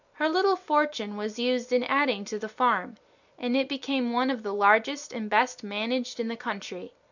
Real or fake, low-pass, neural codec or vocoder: real; 7.2 kHz; none